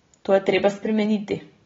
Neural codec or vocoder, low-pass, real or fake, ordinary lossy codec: none; 7.2 kHz; real; AAC, 24 kbps